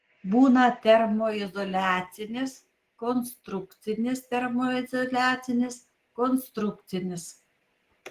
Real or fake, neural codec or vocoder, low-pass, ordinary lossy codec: real; none; 14.4 kHz; Opus, 16 kbps